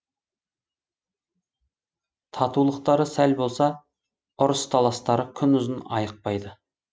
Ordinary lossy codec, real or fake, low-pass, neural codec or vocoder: none; real; none; none